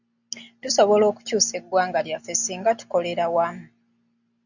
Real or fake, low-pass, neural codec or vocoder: real; 7.2 kHz; none